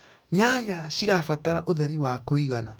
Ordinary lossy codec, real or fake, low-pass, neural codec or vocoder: none; fake; none; codec, 44.1 kHz, 2.6 kbps, DAC